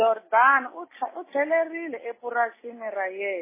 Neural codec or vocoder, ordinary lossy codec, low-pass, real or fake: none; MP3, 16 kbps; 3.6 kHz; real